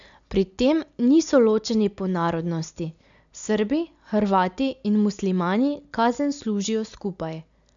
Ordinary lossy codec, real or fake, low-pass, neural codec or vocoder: MP3, 96 kbps; real; 7.2 kHz; none